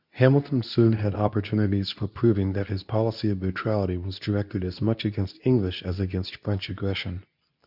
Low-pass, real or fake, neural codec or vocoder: 5.4 kHz; fake; codec, 24 kHz, 0.9 kbps, WavTokenizer, medium speech release version 2